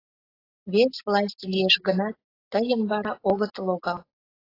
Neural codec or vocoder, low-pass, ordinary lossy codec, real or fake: none; 5.4 kHz; AAC, 24 kbps; real